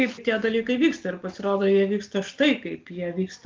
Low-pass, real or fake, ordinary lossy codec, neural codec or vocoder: 7.2 kHz; real; Opus, 24 kbps; none